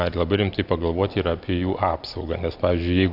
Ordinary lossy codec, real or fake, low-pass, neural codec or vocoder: AAC, 48 kbps; real; 5.4 kHz; none